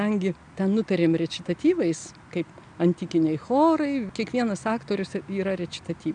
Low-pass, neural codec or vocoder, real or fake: 9.9 kHz; none; real